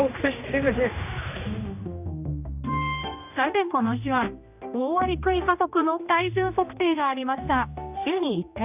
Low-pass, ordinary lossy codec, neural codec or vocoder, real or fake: 3.6 kHz; none; codec, 16 kHz, 1 kbps, X-Codec, HuBERT features, trained on general audio; fake